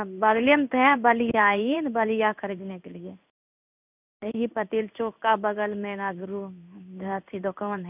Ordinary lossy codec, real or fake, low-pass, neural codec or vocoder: none; fake; 3.6 kHz; codec, 16 kHz in and 24 kHz out, 1 kbps, XY-Tokenizer